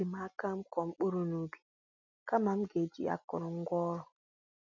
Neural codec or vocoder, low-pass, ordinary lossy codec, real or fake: none; 7.2 kHz; none; real